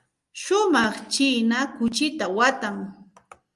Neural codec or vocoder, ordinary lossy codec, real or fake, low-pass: none; Opus, 32 kbps; real; 10.8 kHz